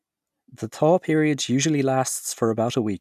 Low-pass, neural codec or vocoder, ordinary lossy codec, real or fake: 14.4 kHz; none; none; real